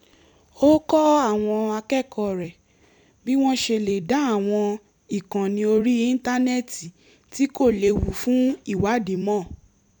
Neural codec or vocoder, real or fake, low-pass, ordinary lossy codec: vocoder, 44.1 kHz, 128 mel bands every 256 samples, BigVGAN v2; fake; 19.8 kHz; none